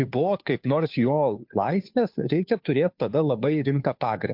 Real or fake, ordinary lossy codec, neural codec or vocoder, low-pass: fake; MP3, 48 kbps; codec, 16 kHz, 2 kbps, FunCodec, trained on Chinese and English, 25 frames a second; 5.4 kHz